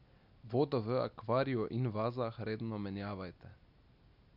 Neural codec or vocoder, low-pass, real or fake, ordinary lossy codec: none; 5.4 kHz; real; none